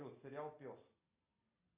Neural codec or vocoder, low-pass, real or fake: none; 3.6 kHz; real